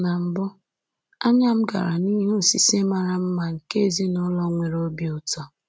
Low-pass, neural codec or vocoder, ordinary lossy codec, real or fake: none; none; none; real